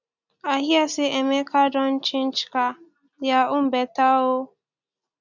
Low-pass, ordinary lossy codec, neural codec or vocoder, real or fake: 7.2 kHz; none; none; real